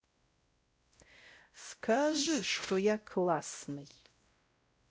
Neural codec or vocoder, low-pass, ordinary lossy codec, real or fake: codec, 16 kHz, 0.5 kbps, X-Codec, WavLM features, trained on Multilingual LibriSpeech; none; none; fake